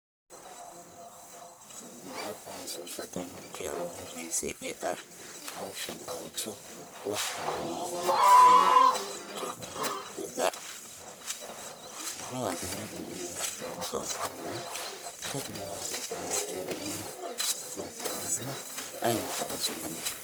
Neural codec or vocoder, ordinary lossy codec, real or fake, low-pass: codec, 44.1 kHz, 1.7 kbps, Pupu-Codec; none; fake; none